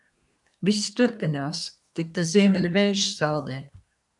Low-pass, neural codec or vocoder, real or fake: 10.8 kHz; codec, 24 kHz, 1 kbps, SNAC; fake